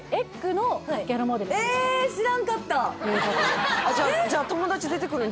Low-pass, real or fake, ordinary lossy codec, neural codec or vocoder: none; real; none; none